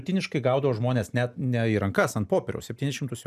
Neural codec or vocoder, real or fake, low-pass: none; real; 14.4 kHz